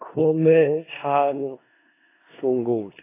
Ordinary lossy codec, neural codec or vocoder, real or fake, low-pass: AAC, 16 kbps; codec, 16 kHz in and 24 kHz out, 0.4 kbps, LongCat-Audio-Codec, four codebook decoder; fake; 3.6 kHz